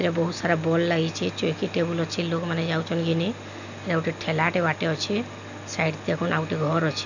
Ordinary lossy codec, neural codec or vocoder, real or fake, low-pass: none; none; real; 7.2 kHz